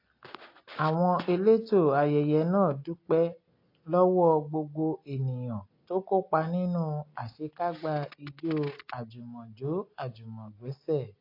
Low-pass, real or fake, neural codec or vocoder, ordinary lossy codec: 5.4 kHz; real; none; AAC, 32 kbps